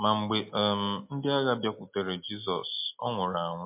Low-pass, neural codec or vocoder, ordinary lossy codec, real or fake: 3.6 kHz; none; MP3, 32 kbps; real